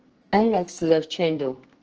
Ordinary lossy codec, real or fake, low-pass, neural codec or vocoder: Opus, 16 kbps; fake; 7.2 kHz; codec, 44.1 kHz, 2.6 kbps, SNAC